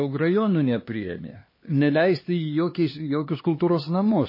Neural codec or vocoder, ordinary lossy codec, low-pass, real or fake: codec, 16 kHz, 2 kbps, X-Codec, HuBERT features, trained on LibriSpeech; MP3, 24 kbps; 5.4 kHz; fake